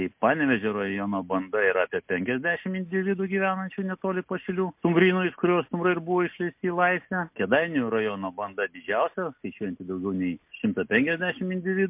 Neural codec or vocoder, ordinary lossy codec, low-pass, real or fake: none; MP3, 32 kbps; 3.6 kHz; real